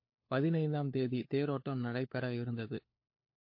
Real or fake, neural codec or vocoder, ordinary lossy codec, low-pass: fake; codec, 16 kHz, 4 kbps, FunCodec, trained on LibriTTS, 50 frames a second; MP3, 32 kbps; 5.4 kHz